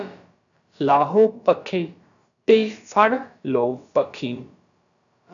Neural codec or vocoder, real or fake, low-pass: codec, 16 kHz, about 1 kbps, DyCAST, with the encoder's durations; fake; 7.2 kHz